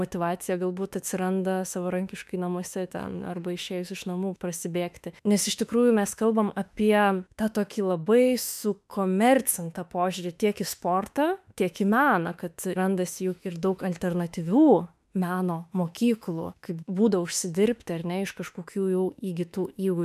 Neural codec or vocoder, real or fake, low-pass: autoencoder, 48 kHz, 32 numbers a frame, DAC-VAE, trained on Japanese speech; fake; 14.4 kHz